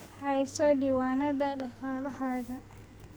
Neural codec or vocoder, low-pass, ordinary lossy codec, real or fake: codec, 44.1 kHz, 2.6 kbps, SNAC; none; none; fake